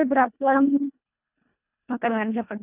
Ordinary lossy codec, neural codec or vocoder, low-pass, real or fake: none; codec, 24 kHz, 1.5 kbps, HILCodec; 3.6 kHz; fake